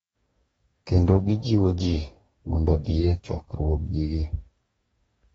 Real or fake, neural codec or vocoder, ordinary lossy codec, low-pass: fake; codec, 44.1 kHz, 2.6 kbps, DAC; AAC, 24 kbps; 19.8 kHz